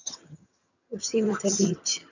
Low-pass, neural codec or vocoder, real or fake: 7.2 kHz; vocoder, 22.05 kHz, 80 mel bands, HiFi-GAN; fake